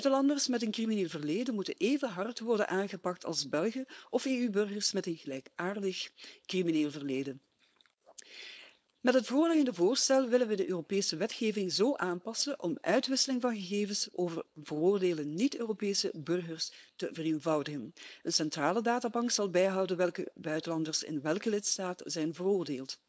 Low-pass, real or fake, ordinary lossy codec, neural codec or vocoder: none; fake; none; codec, 16 kHz, 4.8 kbps, FACodec